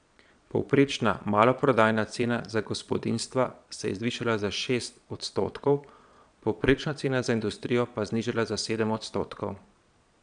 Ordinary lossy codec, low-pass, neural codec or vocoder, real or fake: none; 9.9 kHz; vocoder, 22.05 kHz, 80 mel bands, Vocos; fake